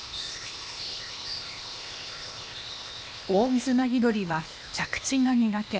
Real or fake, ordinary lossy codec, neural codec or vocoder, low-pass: fake; none; codec, 16 kHz, 0.8 kbps, ZipCodec; none